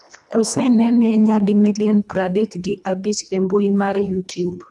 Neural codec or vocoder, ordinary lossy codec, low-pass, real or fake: codec, 24 kHz, 1.5 kbps, HILCodec; none; none; fake